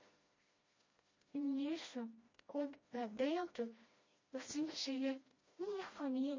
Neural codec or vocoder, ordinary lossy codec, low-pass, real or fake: codec, 16 kHz, 1 kbps, FreqCodec, smaller model; MP3, 32 kbps; 7.2 kHz; fake